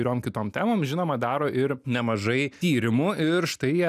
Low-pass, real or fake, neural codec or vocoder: 14.4 kHz; real; none